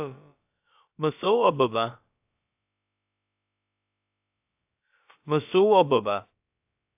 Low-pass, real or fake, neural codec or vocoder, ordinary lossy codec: 3.6 kHz; fake; codec, 16 kHz, about 1 kbps, DyCAST, with the encoder's durations; AAC, 32 kbps